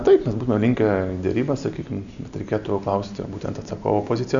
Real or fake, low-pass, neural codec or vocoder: real; 7.2 kHz; none